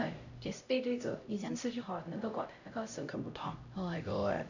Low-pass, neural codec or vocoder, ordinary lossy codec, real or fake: 7.2 kHz; codec, 16 kHz, 0.5 kbps, X-Codec, HuBERT features, trained on LibriSpeech; none; fake